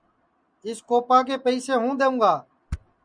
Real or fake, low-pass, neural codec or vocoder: real; 9.9 kHz; none